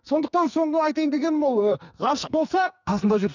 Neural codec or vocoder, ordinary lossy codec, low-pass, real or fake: codec, 44.1 kHz, 2.6 kbps, SNAC; none; 7.2 kHz; fake